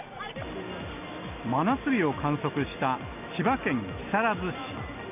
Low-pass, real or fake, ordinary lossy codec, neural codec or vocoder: 3.6 kHz; real; none; none